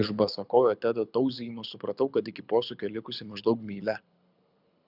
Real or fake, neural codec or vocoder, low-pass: fake; codec, 24 kHz, 6 kbps, HILCodec; 5.4 kHz